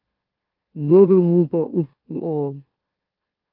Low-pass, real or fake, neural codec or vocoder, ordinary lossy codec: 5.4 kHz; fake; autoencoder, 44.1 kHz, a latent of 192 numbers a frame, MeloTTS; Opus, 32 kbps